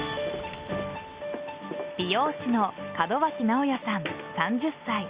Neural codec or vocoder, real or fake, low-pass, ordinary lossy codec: none; real; 3.6 kHz; Opus, 64 kbps